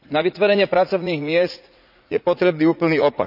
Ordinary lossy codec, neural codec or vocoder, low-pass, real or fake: none; vocoder, 44.1 kHz, 80 mel bands, Vocos; 5.4 kHz; fake